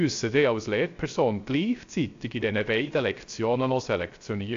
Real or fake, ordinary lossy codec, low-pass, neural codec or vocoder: fake; AAC, 48 kbps; 7.2 kHz; codec, 16 kHz, 0.3 kbps, FocalCodec